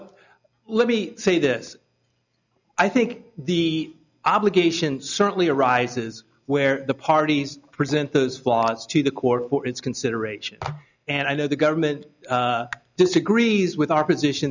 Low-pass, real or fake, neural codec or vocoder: 7.2 kHz; real; none